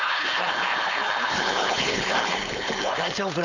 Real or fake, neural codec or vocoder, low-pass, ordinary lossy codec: fake; codec, 16 kHz, 4.8 kbps, FACodec; 7.2 kHz; none